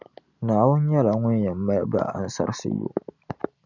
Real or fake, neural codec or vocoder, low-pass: real; none; 7.2 kHz